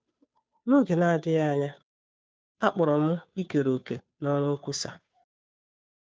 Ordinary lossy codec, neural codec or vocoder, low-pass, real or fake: none; codec, 16 kHz, 2 kbps, FunCodec, trained on Chinese and English, 25 frames a second; none; fake